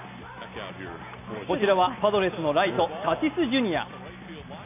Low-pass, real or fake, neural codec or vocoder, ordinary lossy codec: 3.6 kHz; real; none; none